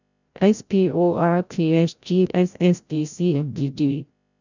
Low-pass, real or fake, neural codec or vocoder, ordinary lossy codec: 7.2 kHz; fake; codec, 16 kHz, 0.5 kbps, FreqCodec, larger model; none